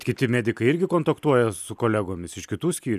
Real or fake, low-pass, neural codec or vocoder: real; 14.4 kHz; none